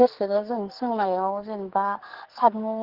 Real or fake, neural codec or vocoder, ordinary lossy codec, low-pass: fake; codec, 44.1 kHz, 2.6 kbps, SNAC; Opus, 16 kbps; 5.4 kHz